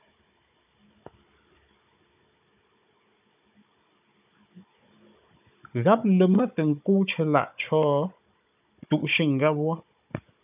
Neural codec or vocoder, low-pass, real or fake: codec, 16 kHz, 16 kbps, FunCodec, trained on Chinese and English, 50 frames a second; 3.6 kHz; fake